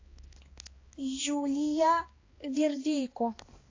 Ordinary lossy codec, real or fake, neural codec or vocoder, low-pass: AAC, 32 kbps; fake; codec, 16 kHz, 2 kbps, X-Codec, HuBERT features, trained on balanced general audio; 7.2 kHz